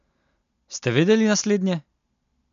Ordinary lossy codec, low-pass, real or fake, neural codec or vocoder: MP3, 64 kbps; 7.2 kHz; real; none